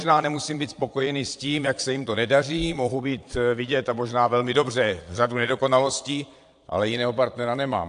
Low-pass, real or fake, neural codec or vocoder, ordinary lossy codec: 9.9 kHz; fake; vocoder, 22.05 kHz, 80 mel bands, Vocos; AAC, 64 kbps